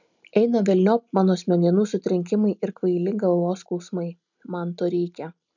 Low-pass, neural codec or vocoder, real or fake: 7.2 kHz; none; real